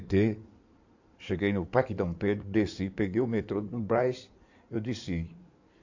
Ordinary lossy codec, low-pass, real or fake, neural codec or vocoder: AAC, 48 kbps; 7.2 kHz; fake; codec, 16 kHz in and 24 kHz out, 2.2 kbps, FireRedTTS-2 codec